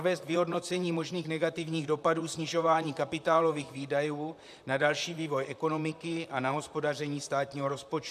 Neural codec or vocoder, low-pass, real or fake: vocoder, 44.1 kHz, 128 mel bands, Pupu-Vocoder; 14.4 kHz; fake